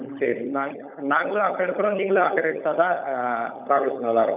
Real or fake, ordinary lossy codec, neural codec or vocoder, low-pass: fake; none; codec, 16 kHz, 16 kbps, FunCodec, trained on LibriTTS, 50 frames a second; 3.6 kHz